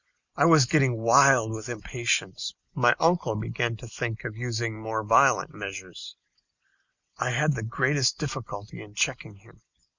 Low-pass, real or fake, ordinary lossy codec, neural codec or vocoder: 7.2 kHz; real; Opus, 64 kbps; none